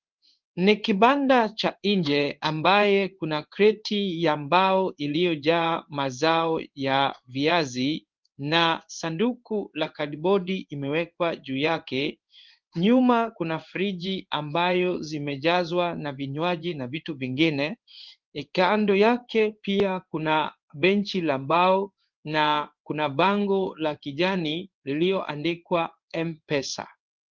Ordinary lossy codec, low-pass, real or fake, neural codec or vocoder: Opus, 24 kbps; 7.2 kHz; fake; codec, 16 kHz in and 24 kHz out, 1 kbps, XY-Tokenizer